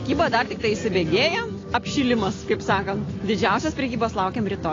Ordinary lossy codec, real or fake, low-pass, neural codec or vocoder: AAC, 32 kbps; real; 7.2 kHz; none